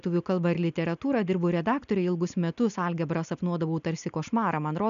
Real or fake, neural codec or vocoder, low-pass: real; none; 7.2 kHz